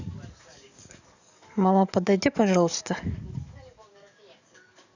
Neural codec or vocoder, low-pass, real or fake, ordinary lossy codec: codec, 44.1 kHz, 7.8 kbps, DAC; 7.2 kHz; fake; none